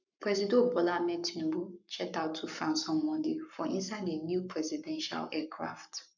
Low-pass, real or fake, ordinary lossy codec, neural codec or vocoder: 7.2 kHz; fake; none; codec, 44.1 kHz, 7.8 kbps, Pupu-Codec